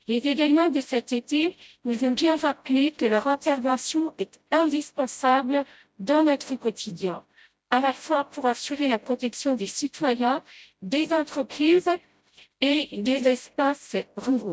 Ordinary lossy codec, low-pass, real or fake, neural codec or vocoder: none; none; fake; codec, 16 kHz, 0.5 kbps, FreqCodec, smaller model